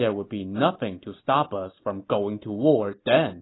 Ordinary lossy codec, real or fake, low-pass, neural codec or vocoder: AAC, 16 kbps; real; 7.2 kHz; none